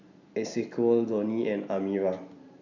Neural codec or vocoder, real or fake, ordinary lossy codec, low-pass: none; real; none; 7.2 kHz